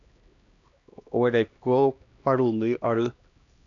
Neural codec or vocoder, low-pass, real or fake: codec, 16 kHz, 1 kbps, X-Codec, HuBERT features, trained on LibriSpeech; 7.2 kHz; fake